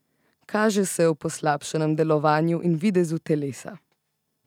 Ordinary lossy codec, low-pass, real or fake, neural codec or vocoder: none; 19.8 kHz; real; none